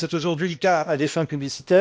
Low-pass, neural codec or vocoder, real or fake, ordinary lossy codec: none; codec, 16 kHz, 1 kbps, X-Codec, HuBERT features, trained on balanced general audio; fake; none